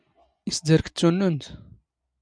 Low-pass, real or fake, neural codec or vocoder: 9.9 kHz; real; none